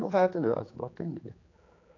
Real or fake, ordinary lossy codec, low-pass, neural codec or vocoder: fake; AAC, 32 kbps; 7.2 kHz; codec, 16 kHz, 4 kbps, X-Codec, HuBERT features, trained on general audio